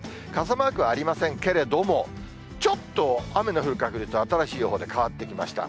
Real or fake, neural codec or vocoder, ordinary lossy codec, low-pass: real; none; none; none